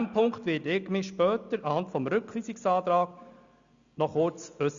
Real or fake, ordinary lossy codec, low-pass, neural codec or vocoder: real; Opus, 64 kbps; 7.2 kHz; none